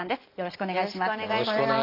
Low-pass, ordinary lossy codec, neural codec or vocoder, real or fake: 5.4 kHz; Opus, 32 kbps; none; real